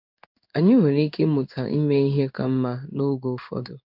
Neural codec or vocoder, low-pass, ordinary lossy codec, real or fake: codec, 16 kHz in and 24 kHz out, 1 kbps, XY-Tokenizer; 5.4 kHz; none; fake